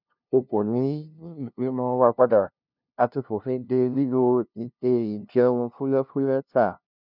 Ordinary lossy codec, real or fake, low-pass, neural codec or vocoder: none; fake; 5.4 kHz; codec, 16 kHz, 0.5 kbps, FunCodec, trained on LibriTTS, 25 frames a second